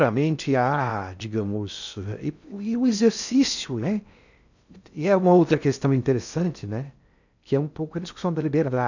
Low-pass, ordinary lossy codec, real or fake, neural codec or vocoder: 7.2 kHz; none; fake; codec, 16 kHz in and 24 kHz out, 0.6 kbps, FocalCodec, streaming, 2048 codes